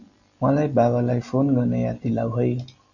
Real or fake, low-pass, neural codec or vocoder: real; 7.2 kHz; none